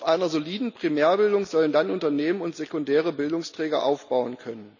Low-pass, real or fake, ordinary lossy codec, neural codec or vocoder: 7.2 kHz; real; none; none